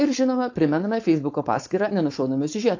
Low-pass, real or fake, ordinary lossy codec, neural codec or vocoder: 7.2 kHz; fake; AAC, 48 kbps; codec, 16 kHz, 4.8 kbps, FACodec